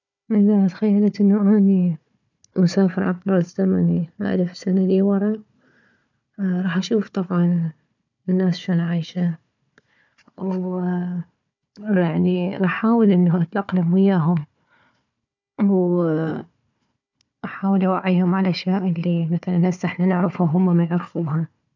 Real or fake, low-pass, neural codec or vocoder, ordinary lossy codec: fake; 7.2 kHz; codec, 16 kHz, 4 kbps, FunCodec, trained on Chinese and English, 50 frames a second; none